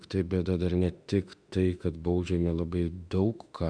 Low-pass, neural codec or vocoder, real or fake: 9.9 kHz; autoencoder, 48 kHz, 32 numbers a frame, DAC-VAE, trained on Japanese speech; fake